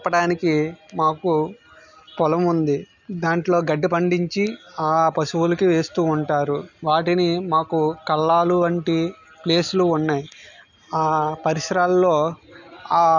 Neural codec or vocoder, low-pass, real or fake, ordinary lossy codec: none; 7.2 kHz; real; none